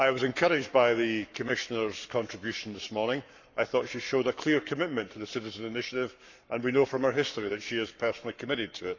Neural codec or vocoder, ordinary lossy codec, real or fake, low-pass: codec, 44.1 kHz, 7.8 kbps, Pupu-Codec; none; fake; 7.2 kHz